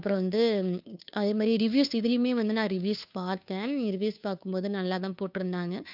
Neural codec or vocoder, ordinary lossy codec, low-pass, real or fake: codec, 16 kHz, 2 kbps, FunCodec, trained on Chinese and English, 25 frames a second; none; 5.4 kHz; fake